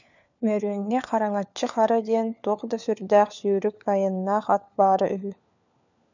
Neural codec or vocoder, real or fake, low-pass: codec, 16 kHz, 4 kbps, FunCodec, trained on LibriTTS, 50 frames a second; fake; 7.2 kHz